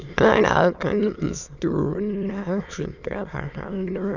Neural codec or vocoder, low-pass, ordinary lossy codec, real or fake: autoencoder, 22.05 kHz, a latent of 192 numbers a frame, VITS, trained on many speakers; 7.2 kHz; none; fake